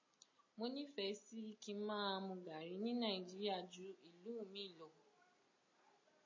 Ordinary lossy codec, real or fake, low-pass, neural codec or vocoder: MP3, 32 kbps; real; 7.2 kHz; none